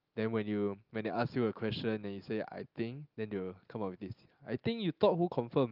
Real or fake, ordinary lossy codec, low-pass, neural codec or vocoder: real; Opus, 32 kbps; 5.4 kHz; none